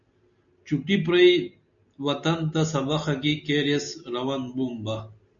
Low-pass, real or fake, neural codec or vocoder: 7.2 kHz; real; none